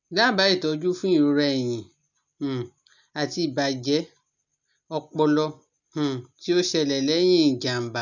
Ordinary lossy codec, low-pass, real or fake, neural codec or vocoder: none; 7.2 kHz; real; none